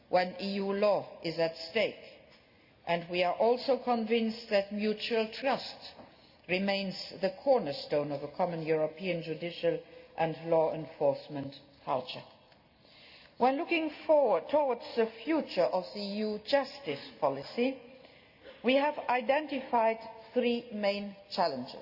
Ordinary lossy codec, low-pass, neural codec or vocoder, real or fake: Opus, 64 kbps; 5.4 kHz; none; real